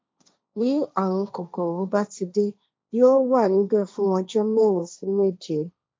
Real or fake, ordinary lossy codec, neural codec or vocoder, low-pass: fake; none; codec, 16 kHz, 1.1 kbps, Voila-Tokenizer; none